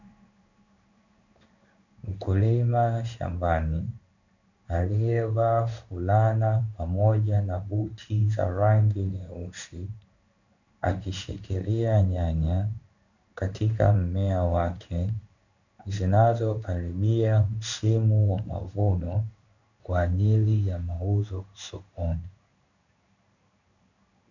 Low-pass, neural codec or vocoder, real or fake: 7.2 kHz; codec, 16 kHz in and 24 kHz out, 1 kbps, XY-Tokenizer; fake